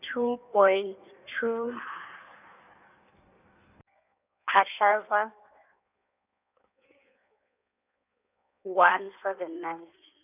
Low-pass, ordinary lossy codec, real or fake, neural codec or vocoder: 3.6 kHz; none; fake; codec, 16 kHz in and 24 kHz out, 1.1 kbps, FireRedTTS-2 codec